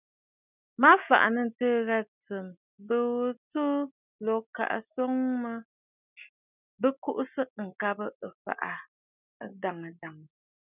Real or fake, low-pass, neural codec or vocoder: real; 3.6 kHz; none